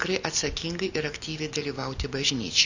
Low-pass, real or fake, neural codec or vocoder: 7.2 kHz; real; none